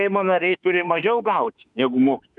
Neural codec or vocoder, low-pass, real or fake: autoencoder, 48 kHz, 32 numbers a frame, DAC-VAE, trained on Japanese speech; 10.8 kHz; fake